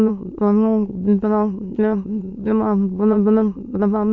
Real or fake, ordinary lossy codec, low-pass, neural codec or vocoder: fake; none; 7.2 kHz; autoencoder, 22.05 kHz, a latent of 192 numbers a frame, VITS, trained on many speakers